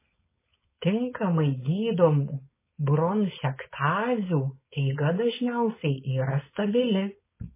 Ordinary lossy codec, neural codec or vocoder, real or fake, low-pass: MP3, 16 kbps; codec, 16 kHz, 4.8 kbps, FACodec; fake; 3.6 kHz